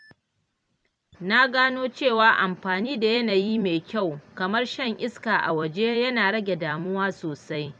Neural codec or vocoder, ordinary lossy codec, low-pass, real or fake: vocoder, 44.1 kHz, 128 mel bands every 256 samples, BigVGAN v2; none; 9.9 kHz; fake